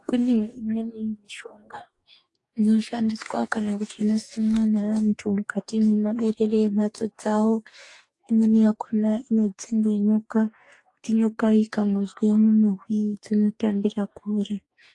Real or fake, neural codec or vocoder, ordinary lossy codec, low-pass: fake; codec, 44.1 kHz, 2.6 kbps, DAC; AAC, 48 kbps; 10.8 kHz